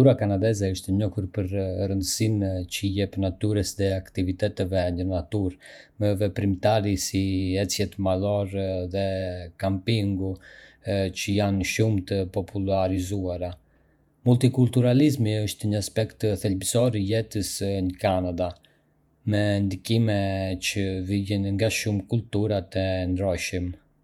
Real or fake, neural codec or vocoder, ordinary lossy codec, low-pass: fake; vocoder, 48 kHz, 128 mel bands, Vocos; none; 19.8 kHz